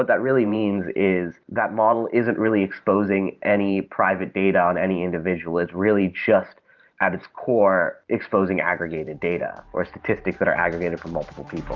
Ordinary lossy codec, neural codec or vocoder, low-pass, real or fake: Opus, 32 kbps; vocoder, 44.1 kHz, 128 mel bands every 512 samples, BigVGAN v2; 7.2 kHz; fake